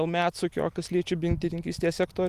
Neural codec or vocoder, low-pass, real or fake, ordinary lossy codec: none; 14.4 kHz; real; Opus, 32 kbps